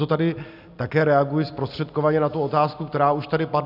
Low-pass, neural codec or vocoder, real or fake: 5.4 kHz; none; real